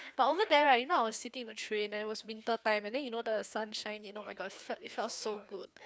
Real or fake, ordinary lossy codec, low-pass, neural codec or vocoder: fake; none; none; codec, 16 kHz, 2 kbps, FreqCodec, larger model